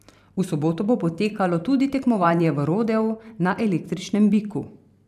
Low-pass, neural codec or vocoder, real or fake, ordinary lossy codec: 14.4 kHz; vocoder, 44.1 kHz, 128 mel bands every 512 samples, BigVGAN v2; fake; none